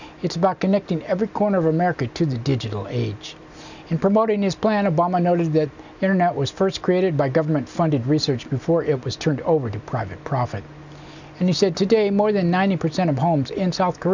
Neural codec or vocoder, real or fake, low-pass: none; real; 7.2 kHz